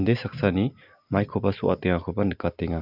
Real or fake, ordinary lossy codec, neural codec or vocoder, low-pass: real; none; none; 5.4 kHz